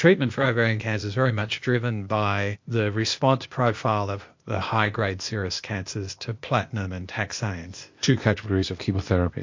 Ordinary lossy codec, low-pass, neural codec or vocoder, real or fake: MP3, 48 kbps; 7.2 kHz; codec, 16 kHz, 0.8 kbps, ZipCodec; fake